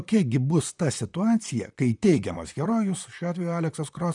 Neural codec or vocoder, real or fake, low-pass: vocoder, 44.1 kHz, 128 mel bands, Pupu-Vocoder; fake; 10.8 kHz